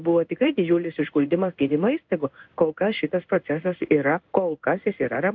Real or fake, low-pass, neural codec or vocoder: fake; 7.2 kHz; codec, 16 kHz in and 24 kHz out, 1 kbps, XY-Tokenizer